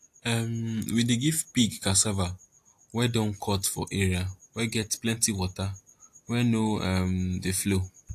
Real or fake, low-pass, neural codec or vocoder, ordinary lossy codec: real; 14.4 kHz; none; AAC, 64 kbps